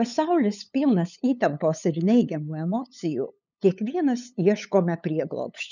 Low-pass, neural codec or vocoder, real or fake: 7.2 kHz; codec, 16 kHz, 8 kbps, FunCodec, trained on LibriTTS, 25 frames a second; fake